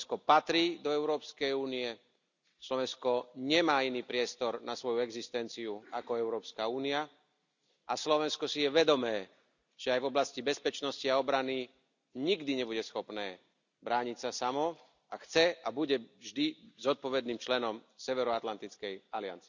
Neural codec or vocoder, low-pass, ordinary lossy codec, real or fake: none; 7.2 kHz; none; real